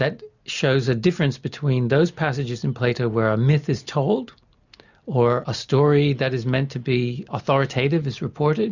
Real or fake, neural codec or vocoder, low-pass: real; none; 7.2 kHz